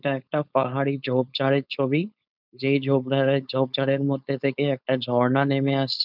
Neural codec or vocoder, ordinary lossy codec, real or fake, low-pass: codec, 16 kHz, 4.8 kbps, FACodec; none; fake; 5.4 kHz